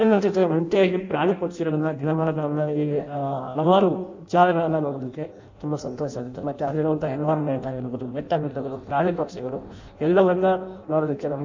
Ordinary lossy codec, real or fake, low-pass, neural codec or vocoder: MP3, 64 kbps; fake; 7.2 kHz; codec, 16 kHz in and 24 kHz out, 0.6 kbps, FireRedTTS-2 codec